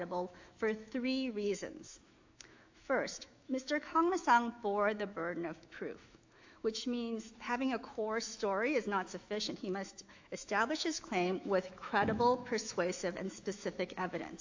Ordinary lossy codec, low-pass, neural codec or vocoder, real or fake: AAC, 48 kbps; 7.2 kHz; autoencoder, 48 kHz, 128 numbers a frame, DAC-VAE, trained on Japanese speech; fake